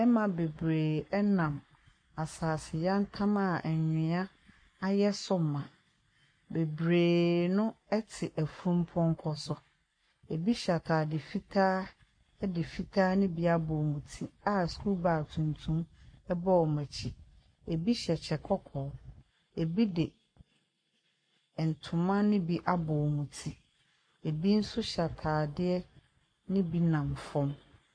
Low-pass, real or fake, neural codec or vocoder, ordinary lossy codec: 9.9 kHz; fake; codec, 44.1 kHz, 7.8 kbps, Pupu-Codec; MP3, 48 kbps